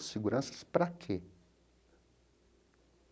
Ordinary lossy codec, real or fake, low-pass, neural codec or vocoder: none; real; none; none